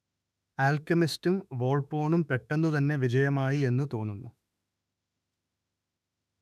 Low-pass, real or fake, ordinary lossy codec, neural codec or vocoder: 14.4 kHz; fake; none; autoencoder, 48 kHz, 32 numbers a frame, DAC-VAE, trained on Japanese speech